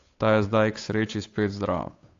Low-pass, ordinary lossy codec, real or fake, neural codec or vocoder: 7.2 kHz; AAC, 48 kbps; fake; codec, 16 kHz, 8 kbps, FunCodec, trained on Chinese and English, 25 frames a second